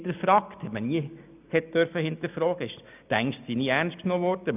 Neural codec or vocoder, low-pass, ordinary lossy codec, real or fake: none; 3.6 kHz; none; real